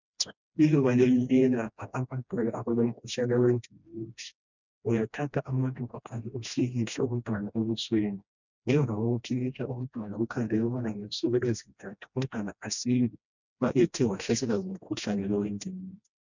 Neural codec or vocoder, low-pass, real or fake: codec, 16 kHz, 1 kbps, FreqCodec, smaller model; 7.2 kHz; fake